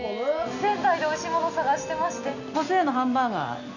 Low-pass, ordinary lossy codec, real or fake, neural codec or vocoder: 7.2 kHz; none; real; none